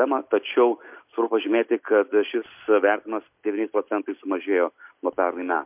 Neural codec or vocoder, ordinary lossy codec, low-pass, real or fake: none; MP3, 32 kbps; 3.6 kHz; real